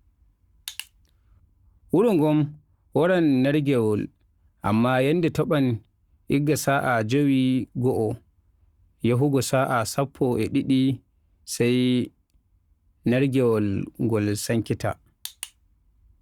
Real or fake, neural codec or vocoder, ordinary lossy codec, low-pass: fake; codec, 44.1 kHz, 7.8 kbps, Pupu-Codec; Opus, 64 kbps; 19.8 kHz